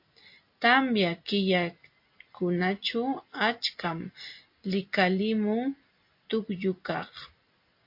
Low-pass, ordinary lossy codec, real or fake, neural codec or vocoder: 5.4 kHz; MP3, 32 kbps; real; none